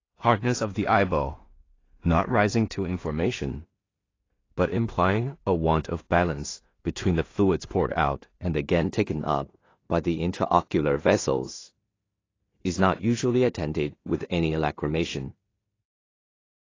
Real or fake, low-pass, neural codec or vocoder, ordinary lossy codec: fake; 7.2 kHz; codec, 16 kHz in and 24 kHz out, 0.4 kbps, LongCat-Audio-Codec, two codebook decoder; AAC, 32 kbps